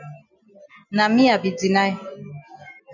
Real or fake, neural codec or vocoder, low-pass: real; none; 7.2 kHz